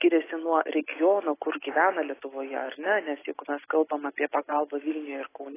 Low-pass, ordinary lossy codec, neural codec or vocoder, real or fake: 3.6 kHz; AAC, 16 kbps; none; real